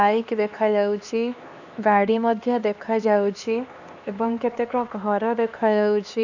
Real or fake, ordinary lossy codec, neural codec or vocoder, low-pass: fake; none; codec, 16 kHz, 2 kbps, X-Codec, HuBERT features, trained on LibriSpeech; 7.2 kHz